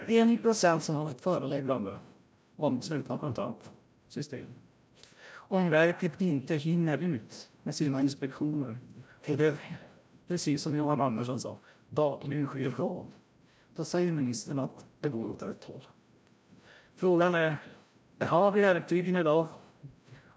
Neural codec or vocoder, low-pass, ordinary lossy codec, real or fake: codec, 16 kHz, 0.5 kbps, FreqCodec, larger model; none; none; fake